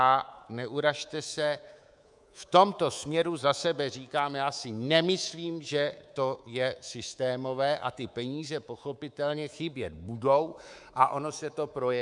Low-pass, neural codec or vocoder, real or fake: 10.8 kHz; codec, 24 kHz, 3.1 kbps, DualCodec; fake